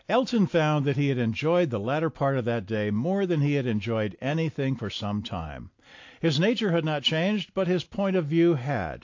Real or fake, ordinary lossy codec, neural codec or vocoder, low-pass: real; AAC, 48 kbps; none; 7.2 kHz